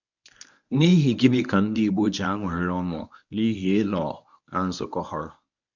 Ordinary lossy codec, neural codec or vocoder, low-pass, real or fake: none; codec, 24 kHz, 0.9 kbps, WavTokenizer, medium speech release version 1; 7.2 kHz; fake